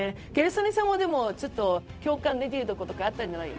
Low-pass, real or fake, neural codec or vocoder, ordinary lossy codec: none; fake; codec, 16 kHz, 0.4 kbps, LongCat-Audio-Codec; none